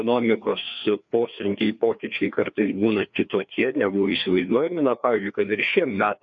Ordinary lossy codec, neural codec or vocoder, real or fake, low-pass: AAC, 64 kbps; codec, 16 kHz, 2 kbps, FreqCodec, larger model; fake; 7.2 kHz